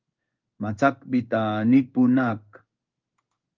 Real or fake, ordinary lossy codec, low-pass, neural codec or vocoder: fake; Opus, 24 kbps; 7.2 kHz; codec, 16 kHz in and 24 kHz out, 1 kbps, XY-Tokenizer